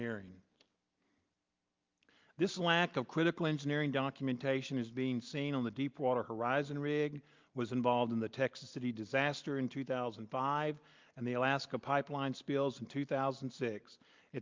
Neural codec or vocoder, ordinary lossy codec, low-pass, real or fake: none; Opus, 24 kbps; 7.2 kHz; real